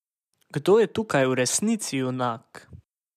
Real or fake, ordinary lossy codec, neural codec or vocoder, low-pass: real; none; none; 14.4 kHz